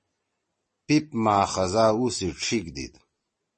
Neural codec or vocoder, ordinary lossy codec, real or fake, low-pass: vocoder, 44.1 kHz, 128 mel bands every 256 samples, BigVGAN v2; MP3, 32 kbps; fake; 10.8 kHz